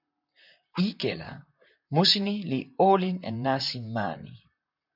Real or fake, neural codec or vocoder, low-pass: fake; vocoder, 44.1 kHz, 128 mel bands, Pupu-Vocoder; 5.4 kHz